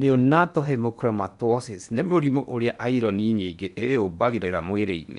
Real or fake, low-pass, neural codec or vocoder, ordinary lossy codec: fake; 10.8 kHz; codec, 16 kHz in and 24 kHz out, 0.8 kbps, FocalCodec, streaming, 65536 codes; none